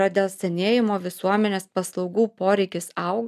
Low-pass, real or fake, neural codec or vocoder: 14.4 kHz; real; none